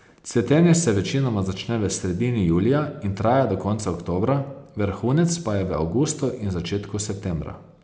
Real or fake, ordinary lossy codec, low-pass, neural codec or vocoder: real; none; none; none